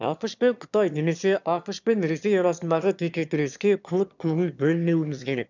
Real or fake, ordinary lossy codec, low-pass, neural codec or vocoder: fake; none; 7.2 kHz; autoencoder, 22.05 kHz, a latent of 192 numbers a frame, VITS, trained on one speaker